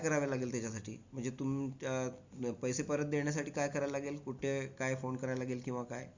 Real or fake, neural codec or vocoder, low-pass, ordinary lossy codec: real; none; 7.2 kHz; Opus, 64 kbps